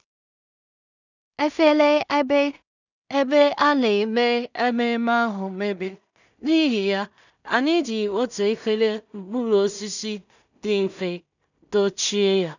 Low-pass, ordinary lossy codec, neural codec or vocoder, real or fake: 7.2 kHz; none; codec, 16 kHz in and 24 kHz out, 0.4 kbps, LongCat-Audio-Codec, two codebook decoder; fake